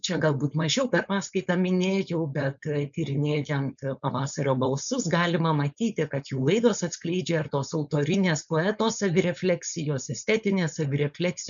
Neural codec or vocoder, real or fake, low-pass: codec, 16 kHz, 4.8 kbps, FACodec; fake; 7.2 kHz